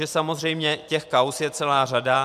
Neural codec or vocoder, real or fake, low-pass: vocoder, 44.1 kHz, 128 mel bands every 512 samples, BigVGAN v2; fake; 14.4 kHz